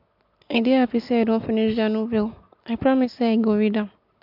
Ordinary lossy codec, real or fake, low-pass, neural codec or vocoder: MP3, 48 kbps; real; 5.4 kHz; none